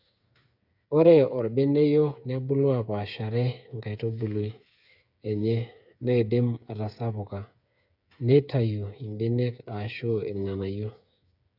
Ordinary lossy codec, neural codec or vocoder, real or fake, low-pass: none; codec, 16 kHz, 8 kbps, FreqCodec, smaller model; fake; 5.4 kHz